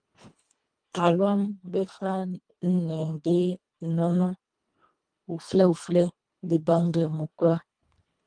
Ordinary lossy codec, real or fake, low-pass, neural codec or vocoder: Opus, 32 kbps; fake; 9.9 kHz; codec, 24 kHz, 1.5 kbps, HILCodec